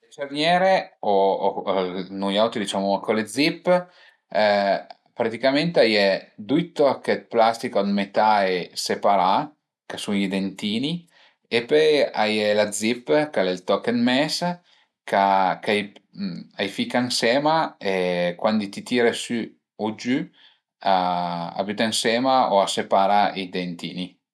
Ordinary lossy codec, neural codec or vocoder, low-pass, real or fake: none; none; none; real